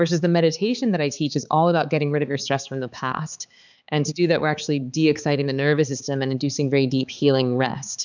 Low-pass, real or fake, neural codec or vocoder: 7.2 kHz; fake; codec, 16 kHz, 4 kbps, X-Codec, HuBERT features, trained on balanced general audio